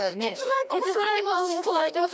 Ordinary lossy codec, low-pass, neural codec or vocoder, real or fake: none; none; codec, 16 kHz, 1 kbps, FreqCodec, larger model; fake